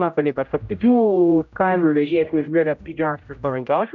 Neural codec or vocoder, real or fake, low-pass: codec, 16 kHz, 0.5 kbps, X-Codec, HuBERT features, trained on general audio; fake; 7.2 kHz